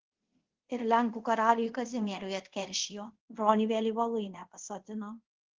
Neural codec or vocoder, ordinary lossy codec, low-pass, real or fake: codec, 24 kHz, 0.5 kbps, DualCodec; Opus, 16 kbps; 7.2 kHz; fake